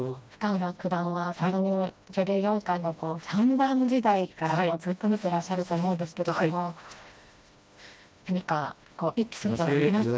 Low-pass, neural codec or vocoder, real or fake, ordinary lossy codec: none; codec, 16 kHz, 1 kbps, FreqCodec, smaller model; fake; none